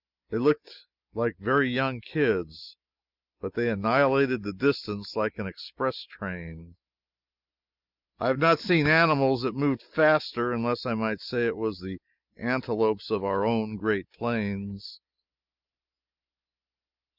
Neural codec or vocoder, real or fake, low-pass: none; real; 5.4 kHz